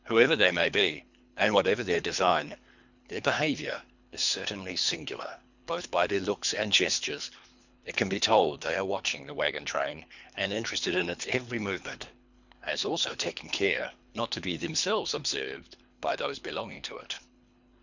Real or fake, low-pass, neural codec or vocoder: fake; 7.2 kHz; codec, 24 kHz, 3 kbps, HILCodec